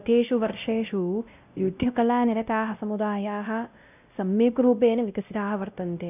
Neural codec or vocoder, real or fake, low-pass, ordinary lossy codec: codec, 16 kHz, 0.5 kbps, X-Codec, WavLM features, trained on Multilingual LibriSpeech; fake; 3.6 kHz; none